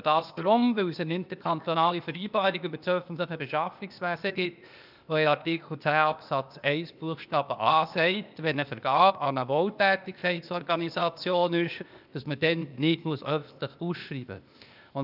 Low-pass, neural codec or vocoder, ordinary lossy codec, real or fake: 5.4 kHz; codec, 16 kHz, 0.8 kbps, ZipCodec; none; fake